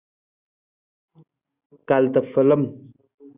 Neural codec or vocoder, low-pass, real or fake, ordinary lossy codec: none; 3.6 kHz; real; Opus, 64 kbps